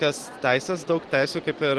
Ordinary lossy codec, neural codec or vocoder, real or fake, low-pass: Opus, 16 kbps; none; real; 10.8 kHz